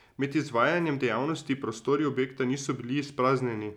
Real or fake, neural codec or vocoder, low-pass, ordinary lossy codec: real; none; 19.8 kHz; none